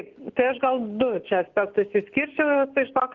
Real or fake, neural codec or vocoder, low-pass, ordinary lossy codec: real; none; 7.2 kHz; Opus, 16 kbps